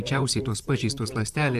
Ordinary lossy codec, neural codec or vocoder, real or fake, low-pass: AAC, 96 kbps; vocoder, 44.1 kHz, 128 mel bands, Pupu-Vocoder; fake; 14.4 kHz